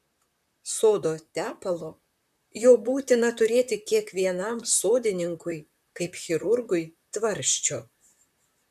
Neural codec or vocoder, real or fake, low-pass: vocoder, 44.1 kHz, 128 mel bands, Pupu-Vocoder; fake; 14.4 kHz